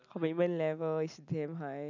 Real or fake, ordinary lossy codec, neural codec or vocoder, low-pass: real; none; none; 7.2 kHz